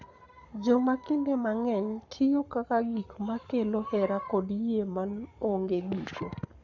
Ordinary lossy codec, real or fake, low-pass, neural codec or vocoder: Opus, 64 kbps; fake; 7.2 kHz; codec, 44.1 kHz, 7.8 kbps, Pupu-Codec